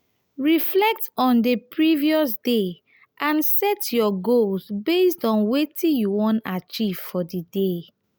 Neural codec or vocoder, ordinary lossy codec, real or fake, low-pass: none; none; real; none